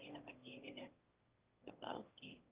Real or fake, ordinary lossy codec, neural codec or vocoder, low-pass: fake; Opus, 32 kbps; autoencoder, 22.05 kHz, a latent of 192 numbers a frame, VITS, trained on one speaker; 3.6 kHz